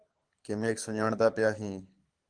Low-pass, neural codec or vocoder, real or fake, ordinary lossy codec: 9.9 kHz; codec, 24 kHz, 6 kbps, HILCodec; fake; Opus, 24 kbps